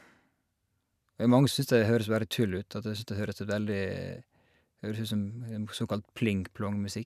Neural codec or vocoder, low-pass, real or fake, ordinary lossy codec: none; 14.4 kHz; real; none